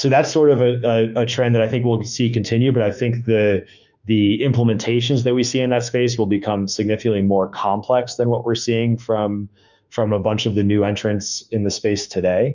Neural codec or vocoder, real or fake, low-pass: autoencoder, 48 kHz, 32 numbers a frame, DAC-VAE, trained on Japanese speech; fake; 7.2 kHz